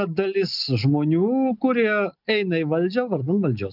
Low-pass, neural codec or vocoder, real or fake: 5.4 kHz; none; real